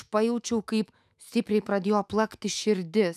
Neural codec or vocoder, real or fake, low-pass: autoencoder, 48 kHz, 128 numbers a frame, DAC-VAE, trained on Japanese speech; fake; 14.4 kHz